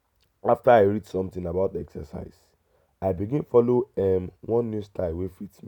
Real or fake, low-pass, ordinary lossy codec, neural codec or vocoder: real; 19.8 kHz; none; none